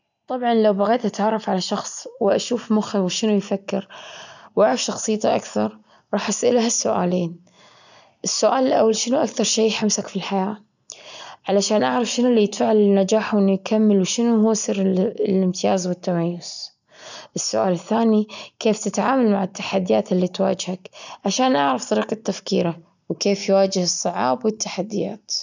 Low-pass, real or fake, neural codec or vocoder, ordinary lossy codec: 7.2 kHz; real; none; none